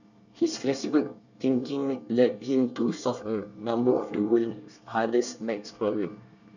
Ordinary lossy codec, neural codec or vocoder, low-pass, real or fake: none; codec, 24 kHz, 1 kbps, SNAC; 7.2 kHz; fake